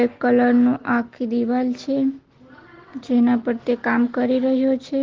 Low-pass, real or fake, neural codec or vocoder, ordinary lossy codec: 7.2 kHz; real; none; Opus, 16 kbps